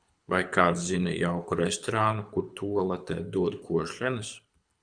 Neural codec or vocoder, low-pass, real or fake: codec, 24 kHz, 6 kbps, HILCodec; 9.9 kHz; fake